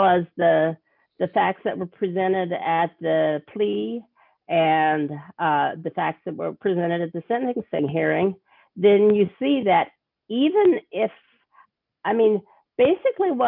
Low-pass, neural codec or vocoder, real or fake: 5.4 kHz; none; real